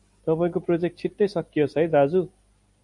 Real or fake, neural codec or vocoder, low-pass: real; none; 10.8 kHz